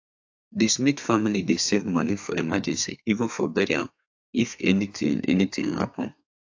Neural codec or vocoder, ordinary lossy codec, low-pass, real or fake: codec, 32 kHz, 1.9 kbps, SNAC; AAC, 48 kbps; 7.2 kHz; fake